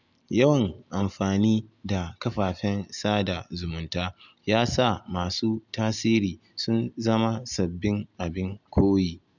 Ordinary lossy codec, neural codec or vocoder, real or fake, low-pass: none; none; real; 7.2 kHz